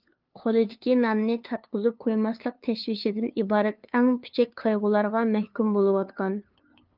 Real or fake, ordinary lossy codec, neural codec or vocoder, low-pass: fake; Opus, 24 kbps; codec, 16 kHz, 2 kbps, FunCodec, trained on LibriTTS, 25 frames a second; 5.4 kHz